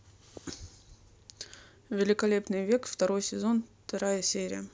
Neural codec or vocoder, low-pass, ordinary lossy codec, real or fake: none; none; none; real